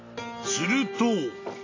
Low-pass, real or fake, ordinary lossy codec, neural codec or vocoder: 7.2 kHz; real; AAC, 32 kbps; none